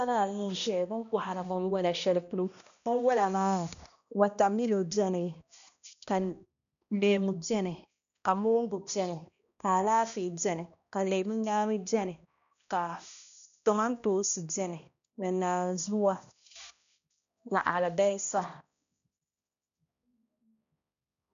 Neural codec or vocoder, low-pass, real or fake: codec, 16 kHz, 1 kbps, X-Codec, HuBERT features, trained on balanced general audio; 7.2 kHz; fake